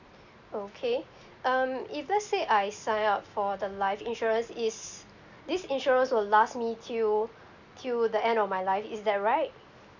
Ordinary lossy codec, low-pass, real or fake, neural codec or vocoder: none; 7.2 kHz; real; none